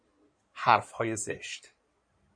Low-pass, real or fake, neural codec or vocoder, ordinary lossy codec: 9.9 kHz; fake; codec, 16 kHz in and 24 kHz out, 2.2 kbps, FireRedTTS-2 codec; MP3, 48 kbps